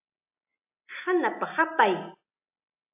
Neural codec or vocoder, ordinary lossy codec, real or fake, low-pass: none; AAC, 24 kbps; real; 3.6 kHz